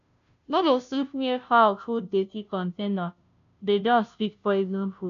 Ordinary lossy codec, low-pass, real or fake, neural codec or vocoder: none; 7.2 kHz; fake; codec, 16 kHz, 0.5 kbps, FunCodec, trained on Chinese and English, 25 frames a second